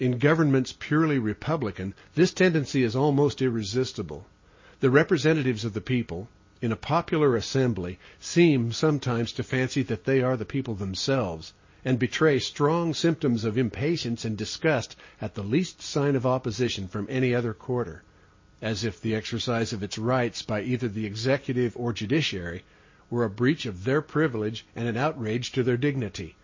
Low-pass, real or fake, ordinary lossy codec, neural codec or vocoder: 7.2 kHz; real; MP3, 32 kbps; none